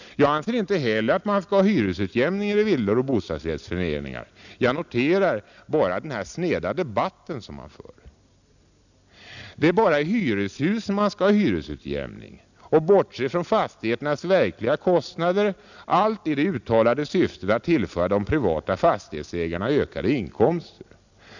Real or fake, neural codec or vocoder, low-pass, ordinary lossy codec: real; none; 7.2 kHz; none